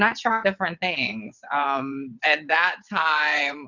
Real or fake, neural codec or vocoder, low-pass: fake; vocoder, 22.05 kHz, 80 mel bands, WaveNeXt; 7.2 kHz